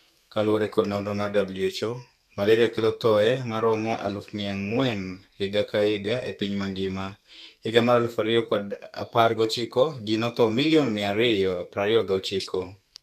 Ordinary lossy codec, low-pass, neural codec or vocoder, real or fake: none; 14.4 kHz; codec, 32 kHz, 1.9 kbps, SNAC; fake